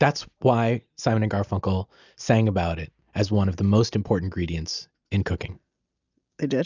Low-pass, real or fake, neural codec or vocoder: 7.2 kHz; real; none